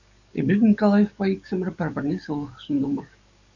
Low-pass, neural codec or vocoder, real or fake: 7.2 kHz; codec, 44.1 kHz, 7.8 kbps, DAC; fake